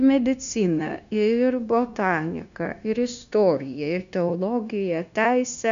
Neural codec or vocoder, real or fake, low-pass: codec, 16 kHz, 0.9 kbps, LongCat-Audio-Codec; fake; 7.2 kHz